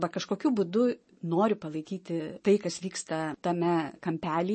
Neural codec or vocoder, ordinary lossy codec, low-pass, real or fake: none; MP3, 32 kbps; 10.8 kHz; real